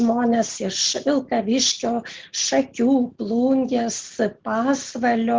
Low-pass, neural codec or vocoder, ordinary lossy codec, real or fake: 7.2 kHz; none; Opus, 16 kbps; real